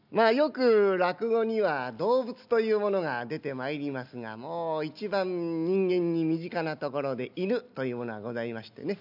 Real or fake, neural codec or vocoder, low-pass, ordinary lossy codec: real; none; 5.4 kHz; none